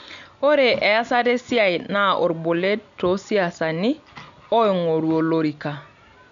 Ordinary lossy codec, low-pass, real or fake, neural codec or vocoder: none; 7.2 kHz; real; none